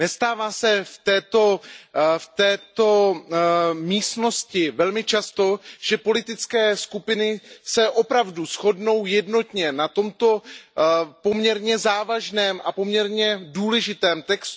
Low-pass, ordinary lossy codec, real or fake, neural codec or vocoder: none; none; real; none